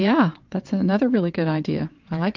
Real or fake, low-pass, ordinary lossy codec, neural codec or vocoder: fake; 7.2 kHz; Opus, 24 kbps; vocoder, 22.05 kHz, 80 mel bands, WaveNeXt